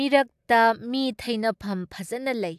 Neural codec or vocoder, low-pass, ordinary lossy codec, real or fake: none; 14.4 kHz; AAC, 96 kbps; real